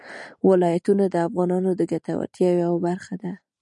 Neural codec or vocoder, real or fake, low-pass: none; real; 10.8 kHz